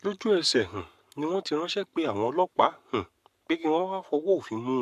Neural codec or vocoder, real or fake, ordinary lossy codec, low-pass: codec, 44.1 kHz, 7.8 kbps, Pupu-Codec; fake; AAC, 96 kbps; 14.4 kHz